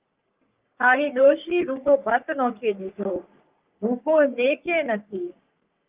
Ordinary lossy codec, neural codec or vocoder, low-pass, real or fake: Opus, 24 kbps; codec, 44.1 kHz, 3.4 kbps, Pupu-Codec; 3.6 kHz; fake